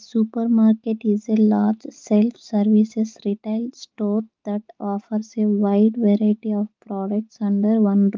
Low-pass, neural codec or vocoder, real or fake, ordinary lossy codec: 7.2 kHz; none; real; Opus, 24 kbps